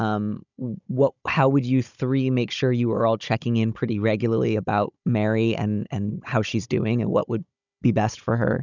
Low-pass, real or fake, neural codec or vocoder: 7.2 kHz; fake; codec, 16 kHz, 16 kbps, FunCodec, trained on Chinese and English, 50 frames a second